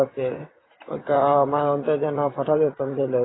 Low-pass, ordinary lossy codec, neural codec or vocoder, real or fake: 7.2 kHz; AAC, 16 kbps; vocoder, 44.1 kHz, 128 mel bands, Pupu-Vocoder; fake